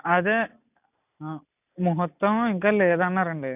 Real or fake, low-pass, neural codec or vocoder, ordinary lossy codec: real; 3.6 kHz; none; none